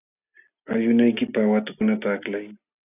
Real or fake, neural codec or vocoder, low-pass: real; none; 3.6 kHz